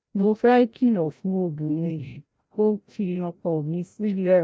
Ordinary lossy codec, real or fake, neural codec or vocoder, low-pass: none; fake; codec, 16 kHz, 0.5 kbps, FreqCodec, larger model; none